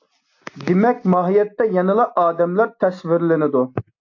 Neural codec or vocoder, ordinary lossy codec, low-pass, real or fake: none; AAC, 48 kbps; 7.2 kHz; real